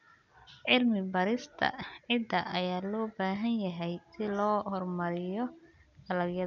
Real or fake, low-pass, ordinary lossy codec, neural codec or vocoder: real; 7.2 kHz; none; none